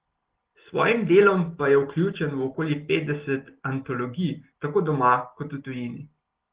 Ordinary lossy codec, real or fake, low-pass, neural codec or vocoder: Opus, 16 kbps; real; 3.6 kHz; none